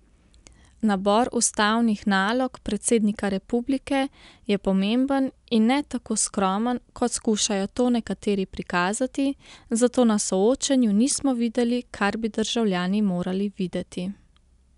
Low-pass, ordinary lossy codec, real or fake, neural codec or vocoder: 10.8 kHz; none; real; none